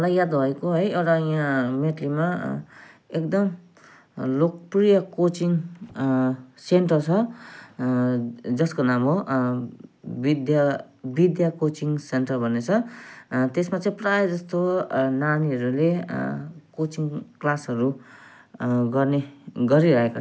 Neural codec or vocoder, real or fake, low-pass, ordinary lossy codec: none; real; none; none